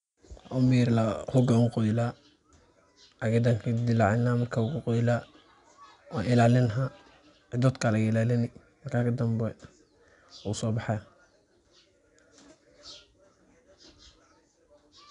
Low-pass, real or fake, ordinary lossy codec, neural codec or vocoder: 10.8 kHz; real; none; none